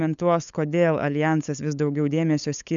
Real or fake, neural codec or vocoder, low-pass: fake; codec, 16 kHz, 8 kbps, FunCodec, trained on LibriTTS, 25 frames a second; 7.2 kHz